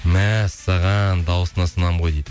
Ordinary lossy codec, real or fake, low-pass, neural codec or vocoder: none; real; none; none